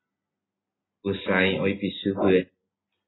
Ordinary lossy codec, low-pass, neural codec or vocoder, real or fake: AAC, 16 kbps; 7.2 kHz; none; real